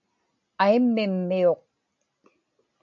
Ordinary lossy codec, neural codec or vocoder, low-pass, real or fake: AAC, 64 kbps; none; 7.2 kHz; real